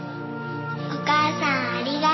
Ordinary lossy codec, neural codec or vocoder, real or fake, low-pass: MP3, 24 kbps; none; real; 7.2 kHz